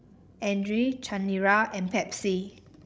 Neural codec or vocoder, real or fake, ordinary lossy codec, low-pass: codec, 16 kHz, 16 kbps, FreqCodec, larger model; fake; none; none